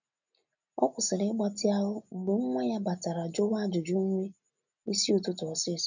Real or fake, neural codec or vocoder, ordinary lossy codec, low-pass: real; none; none; 7.2 kHz